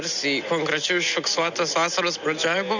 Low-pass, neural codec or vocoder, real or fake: 7.2 kHz; none; real